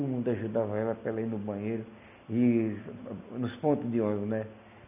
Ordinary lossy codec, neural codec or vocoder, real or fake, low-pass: none; none; real; 3.6 kHz